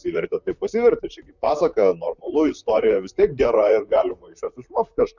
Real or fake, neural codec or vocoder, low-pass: fake; vocoder, 44.1 kHz, 128 mel bands, Pupu-Vocoder; 7.2 kHz